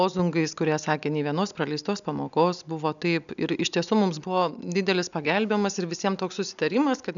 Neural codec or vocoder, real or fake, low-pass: none; real; 7.2 kHz